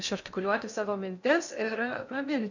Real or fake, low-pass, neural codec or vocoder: fake; 7.2 kHz; codec, 16 kHz in and 24 kHz out, 0.6 kbps, FocalCodec, streaming, 2048 codes